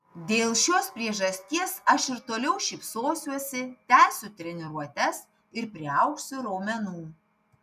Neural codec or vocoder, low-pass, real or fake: none; 14.4 kHz; real